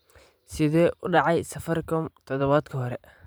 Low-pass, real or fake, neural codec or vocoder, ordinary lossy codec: none; fake; vocoder, 44.1 kHz, 128 mel bands every 512 samples, BigVGAN v2; none